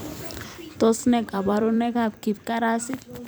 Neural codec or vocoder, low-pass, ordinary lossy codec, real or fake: vocoder, 44.1 kHz, 128 mel bands every 256 samples, BigVGAN v2; none; none; fake